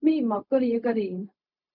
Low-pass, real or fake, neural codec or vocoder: 5.4 kHz; fake; codec, 16 kHz, 0.4 kbps, LongCat-Audio-Codec